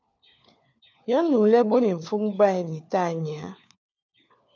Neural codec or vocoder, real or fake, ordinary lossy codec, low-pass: codec, 16 kHz, 4 kbps, FunCodec, trained on LibriTTS, 50 frames a second; fake; MP3, 64 kbps; 7.2 kHz